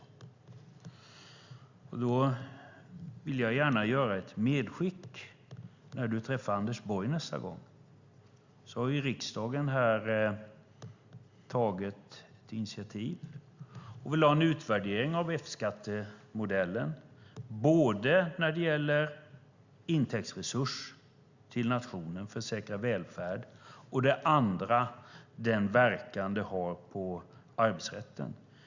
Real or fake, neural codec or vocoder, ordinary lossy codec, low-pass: real; none; Opus, 64 kbps; 7.2 kHz